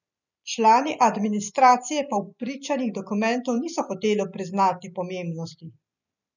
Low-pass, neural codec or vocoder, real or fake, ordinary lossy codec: 7.2 kHz; none; real; none